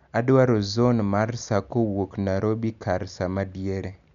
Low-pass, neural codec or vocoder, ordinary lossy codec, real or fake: 7.2 kHz; none; MP3, 96 kbps; real